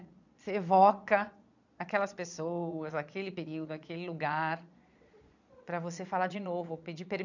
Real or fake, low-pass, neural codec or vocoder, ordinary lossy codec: fake; 7.2 kHz; vocoder, 22.05 kHz, 80 mel bands, Vocos; none